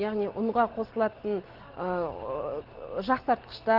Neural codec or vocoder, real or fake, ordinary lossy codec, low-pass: none; real; Opus, 16 kbps; 5.4 kHz